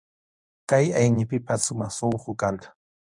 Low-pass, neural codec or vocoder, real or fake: 10.8 kHz; codec, 24 kHz, 0.9 kbps, WavTokenizer, medium speech release version 1; fake